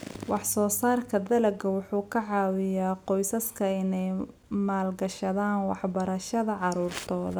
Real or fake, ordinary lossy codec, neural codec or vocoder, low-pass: real; none; none; none